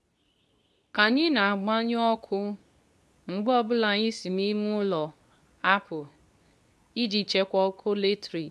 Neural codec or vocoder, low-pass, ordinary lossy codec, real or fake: codec, 24 kHz, 0.9 kbps, WavTokenizer, medium speech release version 2; none; none; fake